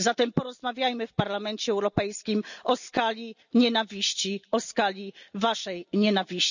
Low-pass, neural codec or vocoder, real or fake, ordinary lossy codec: 7.2 kHz; none; real; none